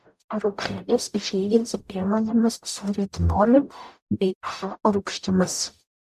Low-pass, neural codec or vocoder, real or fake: 14.4 kHz; codec, 44.1 kHz, 0.9 kbps, DAC; fake